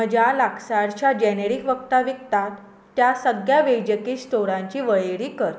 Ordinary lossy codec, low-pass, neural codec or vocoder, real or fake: none; none; none; real